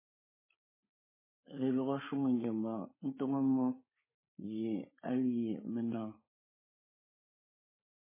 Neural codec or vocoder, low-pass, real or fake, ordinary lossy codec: codec, 16 kHz, 8 kbps, FreqCodec, larger model; 3.6 kHz; fake; MP3, 16 kbps